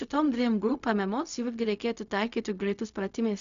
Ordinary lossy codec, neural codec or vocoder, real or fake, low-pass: MP3, 96 kbps; codec, 16 kHz, 0.4 kbps, LongCat-Audio-Codec; fake; 7.2 kHz